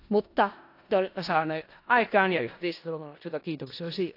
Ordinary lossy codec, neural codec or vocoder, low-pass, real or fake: AAC, 32 kbps; codec, 16 kHz in and 24 kHz out, 0.4 kbps, LongCat-Audio-Codec, four codebook decoder; 5.4 kHz; fake